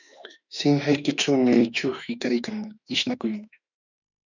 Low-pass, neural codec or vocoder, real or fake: 7.2 kHz; autoencoder, 48 kHz, 32 numbers a frame, DAC-VAE, trained on Japanese speech; fake